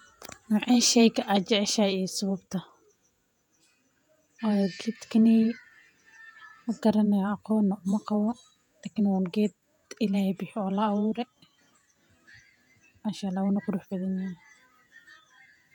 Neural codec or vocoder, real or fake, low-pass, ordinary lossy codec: vocoder, 48 kHz, 128 mel bands, Vocos; fake; 19.8 kHz; none